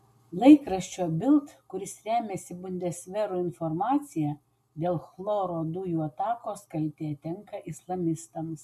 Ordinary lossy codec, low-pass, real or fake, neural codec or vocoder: MP3, 64 kbps; 14.4 kHz; real; none